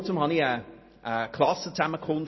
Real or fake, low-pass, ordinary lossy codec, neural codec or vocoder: real; 7.2 kHz; MP3, 24 kbps; none